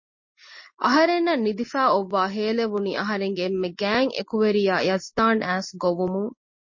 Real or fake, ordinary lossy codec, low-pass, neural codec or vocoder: real; MP3, 32 kbps; 7.2 kHz; none